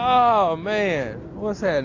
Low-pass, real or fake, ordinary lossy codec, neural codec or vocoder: 7.2 kHz; real; AAC, 48 kbps; none